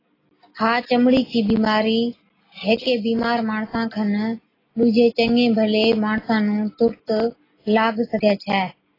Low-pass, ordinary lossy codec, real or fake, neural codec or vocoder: 5.4 kHz; AAC, 24 kbps; real; none